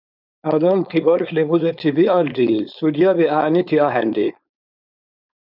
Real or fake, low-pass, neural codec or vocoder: fake; 5.4 kHz; codec, 16 kHz, 4.8 kbps, FACodec